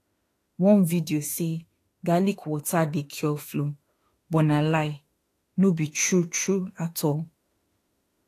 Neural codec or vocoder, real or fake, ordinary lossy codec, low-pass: autoencoder, 48 kHz, 32 numbers a frame, DAC-VAE, trained on Japanese speech; fake; AAC, 48 kbps; 14.4 kHz